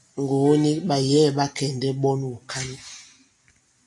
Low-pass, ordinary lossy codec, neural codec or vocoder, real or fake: 10.8 kHz; AAC, 64 kbps; none; real